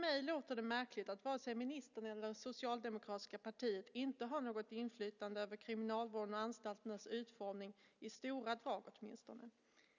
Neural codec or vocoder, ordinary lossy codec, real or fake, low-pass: none; none; real; 7.2 kHz